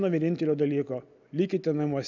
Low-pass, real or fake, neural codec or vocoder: 7.2 kHz; real; none